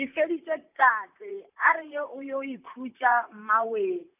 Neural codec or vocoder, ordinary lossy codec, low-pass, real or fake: vocoder, 44.1 kHz, 128 mel bands, Pupu-Vocoder; none; 3.6 kHz; fake